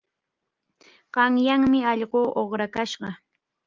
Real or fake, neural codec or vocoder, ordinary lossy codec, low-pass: real; none; Opus, 24 kbps; 7.2 kHz